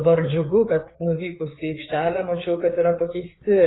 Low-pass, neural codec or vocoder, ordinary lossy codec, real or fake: 7.2 kHz; codec, 16 kHz, 4 kbps, FreqCodec, larger model; AAC, 16 kbps; fake